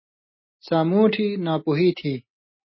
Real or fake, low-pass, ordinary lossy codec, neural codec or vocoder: real; 7.2 kHz; MP3, 24 kbps; none